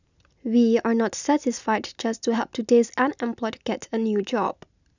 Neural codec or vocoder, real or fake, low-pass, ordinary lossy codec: none; real; 7.2 kHz; none